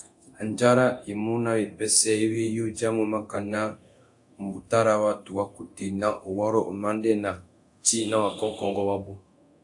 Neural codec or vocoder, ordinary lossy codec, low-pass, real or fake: codec, 24 kHz, 0.9 kbps, DualCodec; AAC, 64 kbps; 10.8 kHz; fake